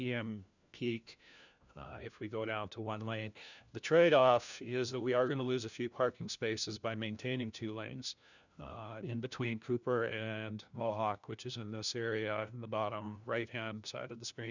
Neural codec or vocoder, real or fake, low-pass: codec, 16 kHz, 1 kbps, FunCodec, trained on LibriTTS, 50 frames a second; fake; 7.2 kHz